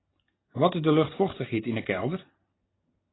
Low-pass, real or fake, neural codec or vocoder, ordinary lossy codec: 7.2 kHz; real; none; AAC, 16 kbps